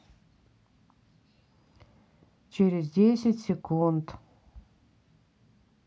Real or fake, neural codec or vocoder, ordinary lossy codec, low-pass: real; none; none; none